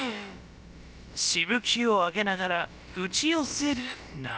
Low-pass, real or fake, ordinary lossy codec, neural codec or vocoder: none; fake; none; codec, 16 kHz, about 1 kbps, DyCAST, with the encoder's durations